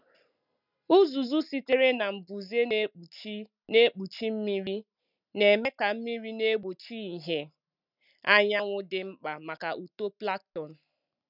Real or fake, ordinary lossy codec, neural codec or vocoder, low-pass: real; none; none; 5.4 kHz